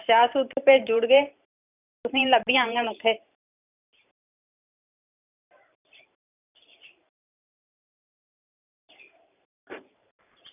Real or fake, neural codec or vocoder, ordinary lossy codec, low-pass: fake; vocoder, 44.1 kHz, 128 mel bands every 512 samples, BigVGAN v2; none; 3.6 kHz